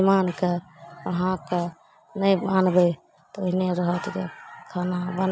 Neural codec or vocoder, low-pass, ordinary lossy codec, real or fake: none; none; none; real